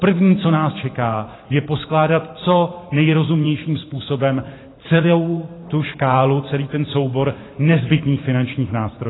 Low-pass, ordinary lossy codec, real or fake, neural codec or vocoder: 7.2 kHz; AAC, 16 kbps; fake; vocoder, 44.1 kHz, 128 mel bands every 512 samples, BigVGAN v2